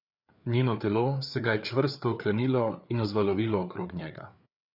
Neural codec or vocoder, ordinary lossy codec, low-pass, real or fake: codec, 16 kHz, 4 kbps, FreqCodec, larger model; none; 5.4 kHz; fake